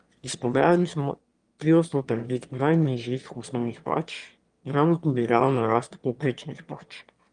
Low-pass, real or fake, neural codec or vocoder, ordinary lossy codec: 9.9 kHz; fake; autoencoder, 22.05 kHz, a latent of 192 numbers a frame, VITS, trained on one speaker; Opus, 32 kbps